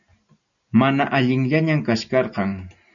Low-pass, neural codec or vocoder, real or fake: 7.2 kHz; none; real